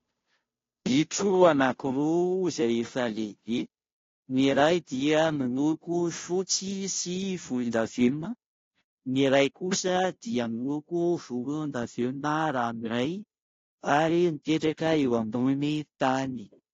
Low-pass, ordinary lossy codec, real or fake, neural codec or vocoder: 7.2 kHz; AAC, 32 kbps; fake; codec, 16 kHz, 0.5 kbps, FunCodec, trained on Chinese and English, 25 frames a second